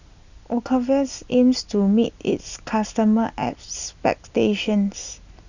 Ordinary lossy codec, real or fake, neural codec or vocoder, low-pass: none; real; none; 7.2 kHz